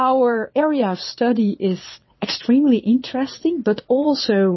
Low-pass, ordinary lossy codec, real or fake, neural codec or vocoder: 7.2 kHz; MP3, 24 kbps; fake; vocoder, 22.05 kHz, 80 mel bands, Vocos